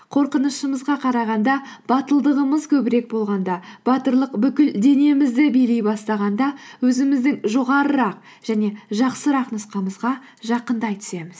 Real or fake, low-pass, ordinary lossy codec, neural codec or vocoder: real; none; none; none